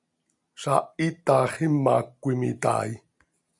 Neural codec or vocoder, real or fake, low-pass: none; real; 10.8 kHz